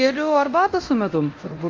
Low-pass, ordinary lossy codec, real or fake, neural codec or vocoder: 7.2 kHz; Opus, 32 kbps; fake; codec, 24 kHz, 0.9 kbps, DualCodec